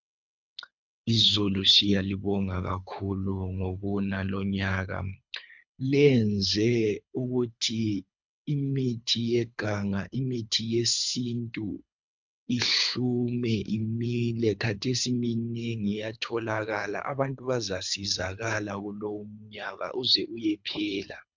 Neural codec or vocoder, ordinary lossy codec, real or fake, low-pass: codec, 24 kHz, 3 kbps, HILCodec; MP3, 64 kbps; fake; 7.2 kHz